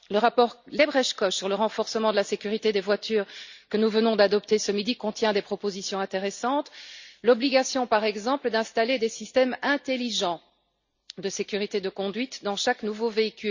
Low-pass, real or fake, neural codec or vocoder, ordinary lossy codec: 7.2 kHz; real; none; Opus, 64 kbps